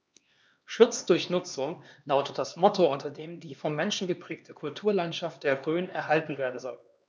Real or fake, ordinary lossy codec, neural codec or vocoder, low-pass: fake; none; codec, 16 kHz, 2 kbps, X-Codec, HuBERT features, trained on LibriSpeech; none